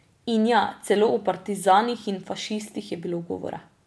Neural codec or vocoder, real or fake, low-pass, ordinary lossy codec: none; real; none; none